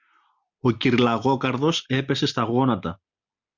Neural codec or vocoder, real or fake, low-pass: none; real; 7.2 kHz